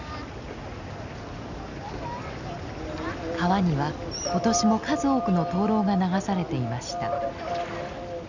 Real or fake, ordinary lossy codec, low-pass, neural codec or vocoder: real; none; 7.2 kHz; none